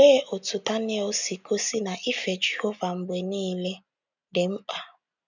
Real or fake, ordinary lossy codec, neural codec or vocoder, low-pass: real; none; none; 7.2 kHz